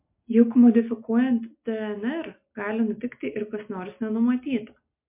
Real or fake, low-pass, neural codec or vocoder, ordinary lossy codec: real; 3.6 kHz; none; MP3, 32 kbps